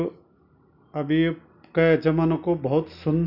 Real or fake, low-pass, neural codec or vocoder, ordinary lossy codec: real; 5.4 kHz; none; none